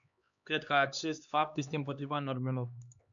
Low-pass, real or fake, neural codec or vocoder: 7.2 kHz; fake; codec, 16 kHz, 2 kbps, X-Codec, HuBERT features, trained on LibriSpeech